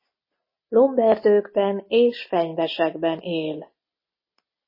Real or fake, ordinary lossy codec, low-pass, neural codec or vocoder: real; MP3, 24 kbps; 5.4 kHz; none